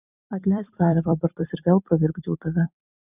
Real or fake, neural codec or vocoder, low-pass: fake; vocoder, 44.1 kHz, 128 mel bands every 256 samples, BigVGAN v2; 3.6 kHz